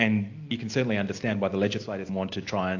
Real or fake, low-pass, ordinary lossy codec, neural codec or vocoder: real; 7.2 kHz; AAC, 48 kbps; none